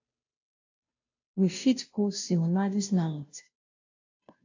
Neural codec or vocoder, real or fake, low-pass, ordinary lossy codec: codec, 16 kHz, 0.5 kbps, FunCodec, trained on Chinese and English, 25 frames a second; fake; 7.2 kHz; AAC, 48 kbps